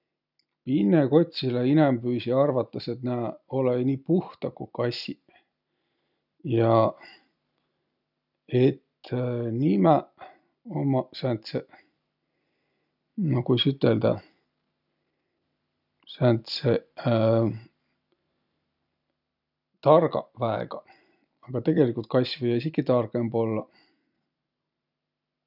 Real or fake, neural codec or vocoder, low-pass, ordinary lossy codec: real; none; 5.4 kHz; none